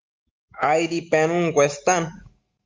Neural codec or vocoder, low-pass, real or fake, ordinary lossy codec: autoencoder, 48 kHz, 128 numbers a frame, DAC-VAE, trained on Japanese speech; 7.2 kHz; fake; Opus, 32 kbps